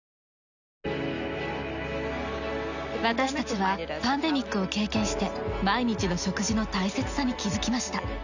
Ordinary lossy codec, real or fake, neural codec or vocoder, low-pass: none; real; none; 7.2 kHz